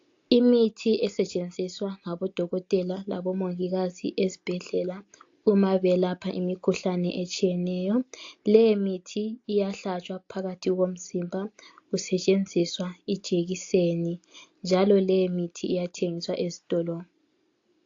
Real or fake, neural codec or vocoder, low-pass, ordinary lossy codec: real; none; 7.2 kHz; AAC, 48 kbps